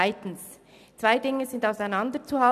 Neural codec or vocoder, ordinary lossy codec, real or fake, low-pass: none; none; real; 14.4 kHz